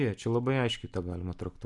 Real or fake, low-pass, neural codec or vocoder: real; 10.8 kHz; none